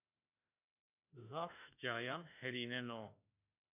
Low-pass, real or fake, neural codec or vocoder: 3.6 kHz; fake; autoencoder, 48 kHz, 32 numbers a frame, DAC-VAE, trained on Japanese speech